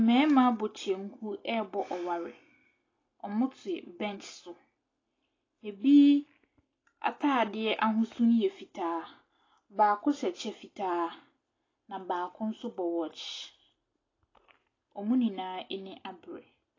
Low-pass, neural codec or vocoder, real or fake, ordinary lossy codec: 7.2 kHz; none; real; AAC, 32 kbps